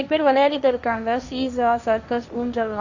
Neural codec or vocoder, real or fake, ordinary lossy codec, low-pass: codec, 16 kHz, 1.1 kbps, Voila-Tokenizer; fake; none; 7.2 kHz